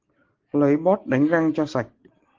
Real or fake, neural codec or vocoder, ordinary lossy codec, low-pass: real; none; Opus, 16 kbps; 7.2 kHz